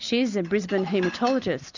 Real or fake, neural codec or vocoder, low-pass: real; none; 7.2 kHz